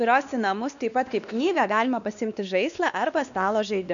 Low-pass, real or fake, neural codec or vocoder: 7.2 kHz; fake; codec, 16 kHz, 2 kbps, X-Codec, WavLM features, trained on Multilingual LibriSpeech